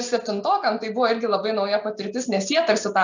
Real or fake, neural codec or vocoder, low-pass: real; none; 7.2 kHz